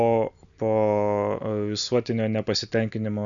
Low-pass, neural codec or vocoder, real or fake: 7.2 kHz; none; real